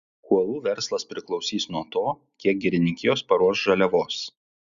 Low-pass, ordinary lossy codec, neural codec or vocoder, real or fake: 7.2 kHz; Opus, 64 kbps; none; real